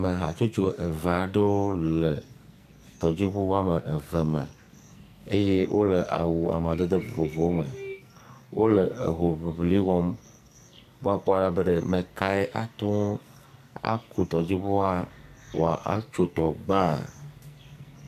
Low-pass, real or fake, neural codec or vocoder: 14.4 kHz; fake; codec, 44.1 kHz, 2.6 kbps, SNAC